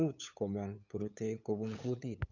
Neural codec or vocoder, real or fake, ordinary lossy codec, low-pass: codec, 16 kHz, 8 kbps, FunCodec, trained on LibriTTS, 25 frames a second; fake; none; 7.2 kHz